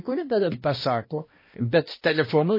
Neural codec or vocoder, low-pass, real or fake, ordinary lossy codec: codec, 16 kHz, 1 kbps, X-Codec, HuBERT features, trained on balanced general audio; 5.4 kHz; fake; MP3, 24 kbps